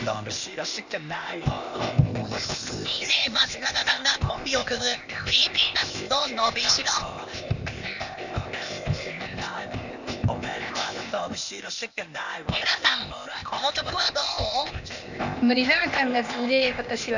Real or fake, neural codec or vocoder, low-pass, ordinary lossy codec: fake; codec, 16 kHz, 0.8 kbps, ZipCodec; 7.2 kHz; none